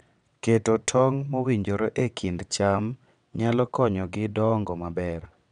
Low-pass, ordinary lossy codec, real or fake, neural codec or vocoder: 9.9 kHz; none; fake; vocoder, 22.05 kHz, 80 mel bands, WaveNeXt